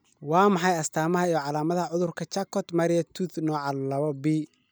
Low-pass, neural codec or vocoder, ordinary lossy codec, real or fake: none; none; none; real